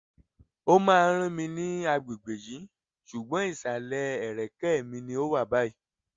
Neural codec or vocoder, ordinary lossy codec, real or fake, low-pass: none; Opus, 32 kbps; real; 7.2 kHz